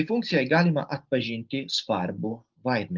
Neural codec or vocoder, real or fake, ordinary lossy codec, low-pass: none; real; Opus, 32 kbps; 7.2 kHz